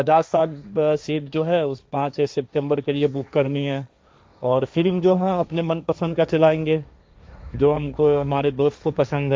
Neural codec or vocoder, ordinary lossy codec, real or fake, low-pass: codec, 16 kHz, 1.1 kbps, Voila-Tokenizer; none; fake; none